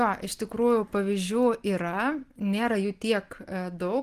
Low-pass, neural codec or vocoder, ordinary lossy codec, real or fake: 14.4 kHz; none; Opus, 24 kbps; real